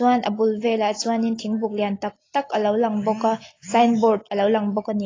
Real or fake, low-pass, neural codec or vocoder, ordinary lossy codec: real; 7.2 kHz; none; AAC, 32 kbps